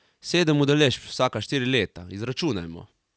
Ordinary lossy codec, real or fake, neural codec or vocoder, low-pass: none; real; none; none